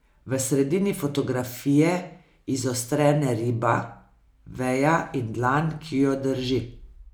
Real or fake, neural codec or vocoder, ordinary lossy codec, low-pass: real; none; none; none